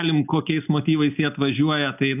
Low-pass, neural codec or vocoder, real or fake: 3.6 kHz; none; real